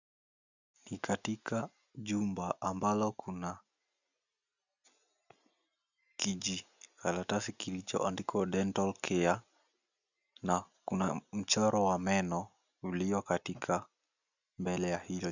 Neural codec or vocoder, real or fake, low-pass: none; real; 7.2 kHz